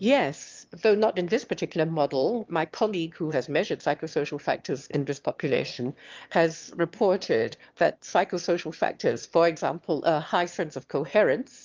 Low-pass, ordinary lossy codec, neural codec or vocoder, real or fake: 7.2 kHz; Opus, 32 kbps; autoencoder, 22.05 kHz, a latent of 192 numbers a frame, VITS, trained on one speaker; fake